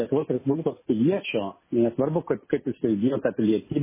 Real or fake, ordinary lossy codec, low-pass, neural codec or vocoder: real; MP3, 16 kbps; 3.6 kHz; none